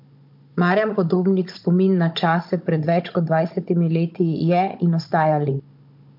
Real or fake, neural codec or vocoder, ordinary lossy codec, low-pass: fake; codec, 16 kHz, 16 kbps, FunCodec, trained on Chinese and English, 50 frames a second; MP3, 48 kbps; 5.4 kHz